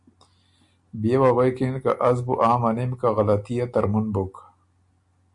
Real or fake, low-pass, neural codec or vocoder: real; 10.8 kHz; none